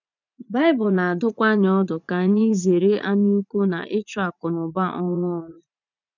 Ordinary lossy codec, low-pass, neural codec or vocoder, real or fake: none; 7.2 kHz; vocoder, 44.1 kHz, 80 mel bands, Vocos; fake